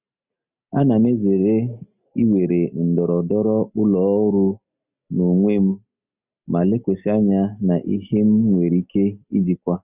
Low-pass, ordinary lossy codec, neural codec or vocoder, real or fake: 3.6 kHz; none; none; real